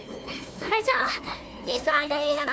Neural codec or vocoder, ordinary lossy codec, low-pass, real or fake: codec, 16 kHz, 1 kbps, FunCodec, trained on Chinese and English, 50 frames a second; none; none; fake